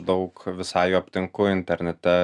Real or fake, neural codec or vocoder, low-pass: real; none; 10.8 kHz